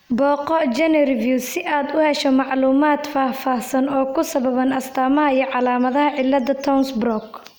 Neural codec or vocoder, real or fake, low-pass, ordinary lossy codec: none; real; none; none